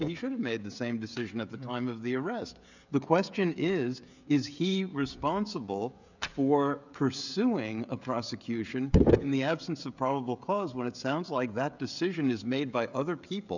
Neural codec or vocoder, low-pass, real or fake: codec, 16 kHz, 16 kbps, FreqCodec, smaller model; 7.2 kHz; fake